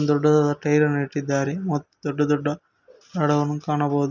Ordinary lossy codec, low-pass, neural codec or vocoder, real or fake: none; 7.2 kHz; none; real